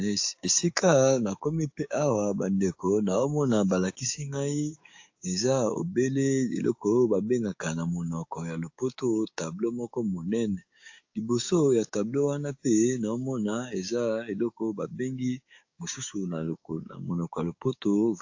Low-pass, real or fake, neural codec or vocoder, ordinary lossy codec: 7.2 kHz; fake; codec, 16 kHz, 6 kbps, DAC; AAC, 48 kbps